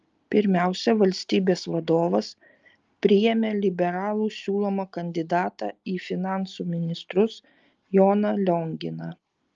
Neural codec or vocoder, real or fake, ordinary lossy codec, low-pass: none; real; Opus, 24 kbps; 7.2 kHz